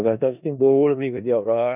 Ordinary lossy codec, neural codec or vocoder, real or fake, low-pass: none; codec, 16 kHz in and 24 kHz out, 0.4 kbps, LongCat-Audio-Codec, four codebook decoder; fake; 3.6 kHz